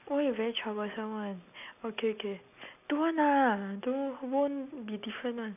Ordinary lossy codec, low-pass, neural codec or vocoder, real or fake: none; 3.6 kHz; none; real